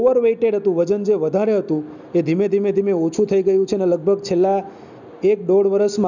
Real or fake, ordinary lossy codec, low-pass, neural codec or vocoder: real; none; 7.2 kHz; none